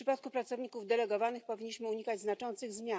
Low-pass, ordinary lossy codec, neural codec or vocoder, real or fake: none; none; none; real